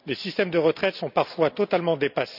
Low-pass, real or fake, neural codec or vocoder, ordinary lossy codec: 5.4 kHz; real; none; none